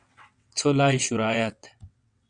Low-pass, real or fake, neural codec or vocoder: 9.9 kHz; fake; vocoder, 22.05 kHz, 80 mel bands, WaveNeXt